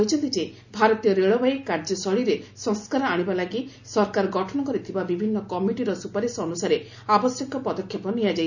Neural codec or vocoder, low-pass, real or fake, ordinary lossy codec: none; 7.2 kHz; real; none